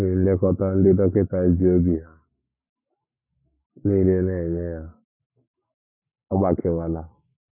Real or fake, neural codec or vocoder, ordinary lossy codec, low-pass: fake; codec, 44.1 kHz, 7.8 kbps, Pupu-Codec; none; 3.6 kHz